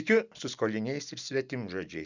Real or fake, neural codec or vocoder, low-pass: real; none; 7.2 kHz